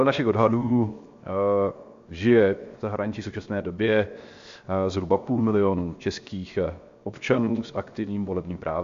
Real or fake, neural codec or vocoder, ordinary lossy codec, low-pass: fake; codec, 16 kHz, 0.7 kbps, FocalCodec; MP3, 48 kbps; 7.2 kHz